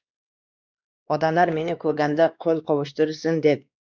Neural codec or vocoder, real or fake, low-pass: codec, 16 kHz, 2 kbps, X-Codec, WavLM features, trained on Multilingual LibriSpeech; fake; 7.2 kHz